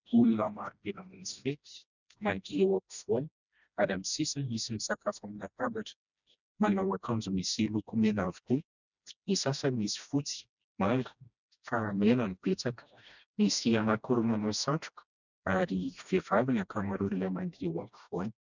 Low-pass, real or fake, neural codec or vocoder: 7.2 kHz; fake; codec, 16 kHz, 1 kbps, FreqCodec, smaller model